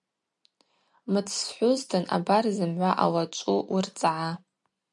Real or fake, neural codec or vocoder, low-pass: real; none; 10.8 kHz